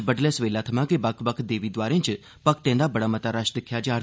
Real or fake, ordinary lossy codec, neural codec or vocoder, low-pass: real; none; none; none